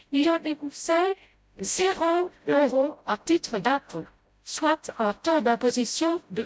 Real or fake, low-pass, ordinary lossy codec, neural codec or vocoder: fake; none; none; codec, 16 kHz, 0.5 kbps, FreqCodec, smaller model